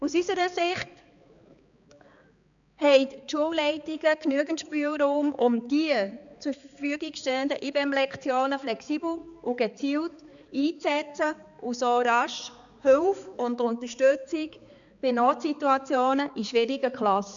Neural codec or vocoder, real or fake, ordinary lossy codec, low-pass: codec, 16 kHz, 4 kbps, X-Codec, HuBERT features, trained on balanced general audio; fake; none; 7.2 kHz